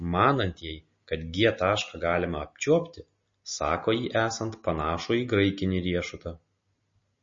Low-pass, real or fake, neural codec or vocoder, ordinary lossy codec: 7.2 kHz; real; none; MP3, 32 kbps